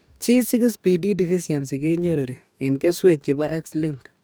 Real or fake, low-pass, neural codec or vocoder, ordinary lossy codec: fake; none; codec, 44.1 kHz, 2.6 kbps, DAC; none